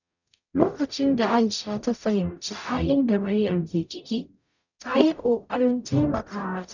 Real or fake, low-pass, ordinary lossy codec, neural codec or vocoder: fake; 7.2 kHz; none; codec, 44.1 kHz, 0.9 kbps, DAC